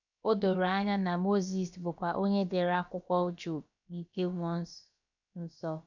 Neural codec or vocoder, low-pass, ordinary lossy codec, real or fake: codec, 16 kHz, about 1 kbps, DyCAST, with the encoder's durations; 7.2 kHz; none; fake